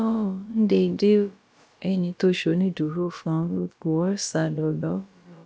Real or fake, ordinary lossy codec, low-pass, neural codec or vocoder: fake; none; none; codec, 16 kHz, about 1 kbps, DyCAST, with the encoder's durations